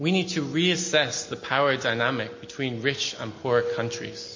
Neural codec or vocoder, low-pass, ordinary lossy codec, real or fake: none; 7.2 kHz; MP3, 32 kbps; real